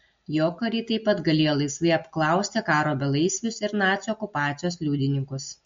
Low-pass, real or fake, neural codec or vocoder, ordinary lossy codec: 7.2 kHz; real; none; MP3, 48 kbps